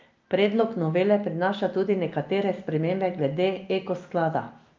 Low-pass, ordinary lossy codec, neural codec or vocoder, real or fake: 7.2 kHz; Opus, 24 kbps; none; real